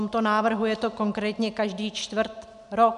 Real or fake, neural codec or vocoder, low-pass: real; none; 10.8 kHz